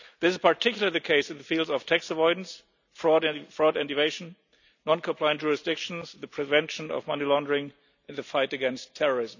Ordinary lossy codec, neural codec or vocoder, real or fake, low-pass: none; none; real; 7.2 kHz